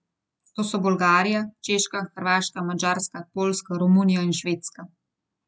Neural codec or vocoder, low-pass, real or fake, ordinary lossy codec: none; none; real; none